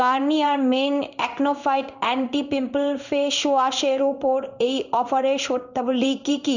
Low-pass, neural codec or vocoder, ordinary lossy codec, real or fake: 7.2 kHz; codec, 16 kHz in and 24 kHz out, 1 kbps, XY-Tokenizer; none; fake